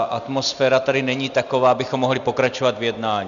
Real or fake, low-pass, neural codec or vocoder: real; 7.2 kHz; none